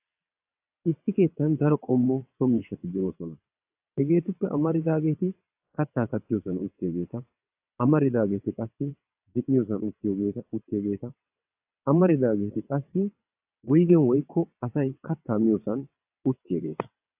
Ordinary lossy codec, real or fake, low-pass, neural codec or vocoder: AAC, 32 kbps; fake; 3.6 kHz; vocoder, 22.05 kHz, 80 mel bands, WaveNeXt